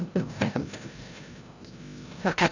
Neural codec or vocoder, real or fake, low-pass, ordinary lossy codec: codec, 16 kHz, 0.5 kbps, FreqCodec, larger model; fake; 7.2 kHz; none